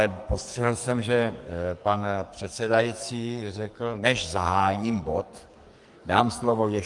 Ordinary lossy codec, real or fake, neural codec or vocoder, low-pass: Opus, 32 kbps; fake; codec, 44.1 kHz, 2.6 kbps, SNAC; 10.8 kHz